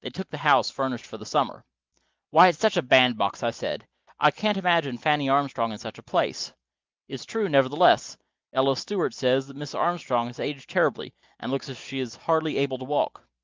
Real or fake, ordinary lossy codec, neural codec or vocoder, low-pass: real; Opus, 32 kbps; none; 7.2 kHz